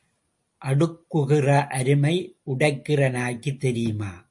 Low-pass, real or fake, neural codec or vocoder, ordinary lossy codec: 10.8 kHz; real; none; MP3, 48 kbps